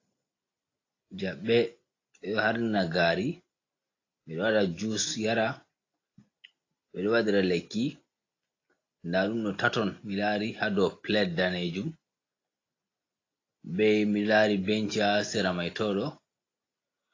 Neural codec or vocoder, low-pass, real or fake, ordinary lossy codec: none; 7.2 kHz; real; AAC, 32 kbps